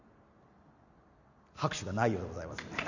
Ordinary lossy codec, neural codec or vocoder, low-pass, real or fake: none; none; 7.2 kHz; real